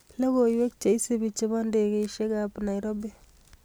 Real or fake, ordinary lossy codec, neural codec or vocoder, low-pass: real; none; none; none